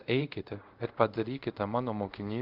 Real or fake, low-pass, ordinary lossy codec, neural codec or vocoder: fake; 5.4 kHz; Opus, 24 kbps; codec, 24 kHz, 0.5 kbps, DualCodec